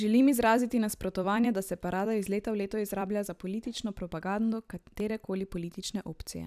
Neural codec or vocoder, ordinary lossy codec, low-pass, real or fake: vocoder, 44.1 kHz, 128 mel bands every 256 samples, BigVGAN v2; none; 14.4 kHz; fake